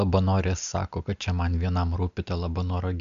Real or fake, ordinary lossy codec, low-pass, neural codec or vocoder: real; MP3, 64 kbps; 7.2 kHz; none